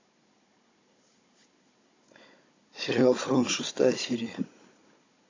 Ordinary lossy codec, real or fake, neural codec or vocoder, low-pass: AAC, 32 kbps; fake; codec, 16 kHz, 16 kbps, FunCodec, trained on Chinese and English, 50 frames a second; 7.2 kHz